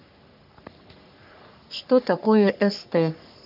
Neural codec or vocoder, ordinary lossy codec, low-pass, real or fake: codec, 44.1 kHz, 3.4 kbps, Pupu-Codec; none; 5.4 kHz; fake